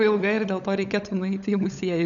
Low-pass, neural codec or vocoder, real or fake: 7.2 kHz; codec, 16 kHz, 16 kbps, FunCodec, trained on LibriTTS, 50 frames a second; fake